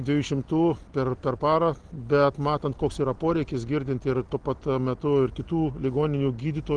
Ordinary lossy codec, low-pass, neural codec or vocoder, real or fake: Opus, 16 kbps; 9.9 kHz; none; real